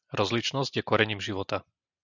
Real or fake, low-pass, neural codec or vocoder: real; 7.2 kHz; none